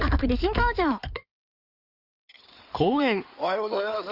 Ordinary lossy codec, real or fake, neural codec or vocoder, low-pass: Opus, 64 kbps; fake; codec, 16 kHz in and 24 kHz out, 2.2 kbps, FireRedTTS-2 codec; 5.4 kHz